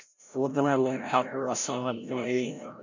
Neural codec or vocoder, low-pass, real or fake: codec, 16 kHz, 0.5 kbps, FreqCodec, larger model; 7.2 kHz; fake